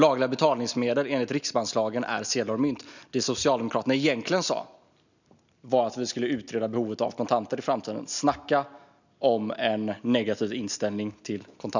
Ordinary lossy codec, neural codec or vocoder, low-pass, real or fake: none; none; 7.2 kHz; real